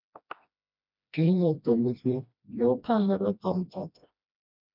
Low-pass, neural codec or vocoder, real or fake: 5.4 kHz; codec, 16 kHz, 1 kbps, FreqCodec, smaller model; fake